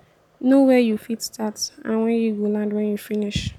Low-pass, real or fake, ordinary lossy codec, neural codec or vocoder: 19.8 kHz; real; none; none